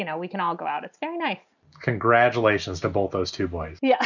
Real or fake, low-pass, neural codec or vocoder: real; 7.2 kHz; none